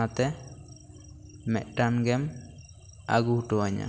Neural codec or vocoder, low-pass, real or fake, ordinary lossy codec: none; none; real; none